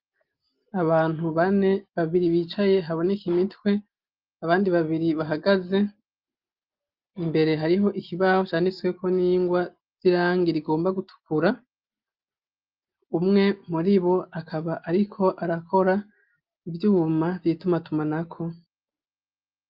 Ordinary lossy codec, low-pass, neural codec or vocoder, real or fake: Opus, 24 kbps; 5.4 kHz; none; real